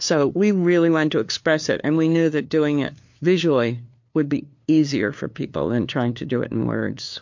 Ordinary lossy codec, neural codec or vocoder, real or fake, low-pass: MP3, 48 kbps; codec, 16 kHz, 2 kbps, FunCodec, trained on LibriTTS, 25 frames a second; fake; 7.2 kHz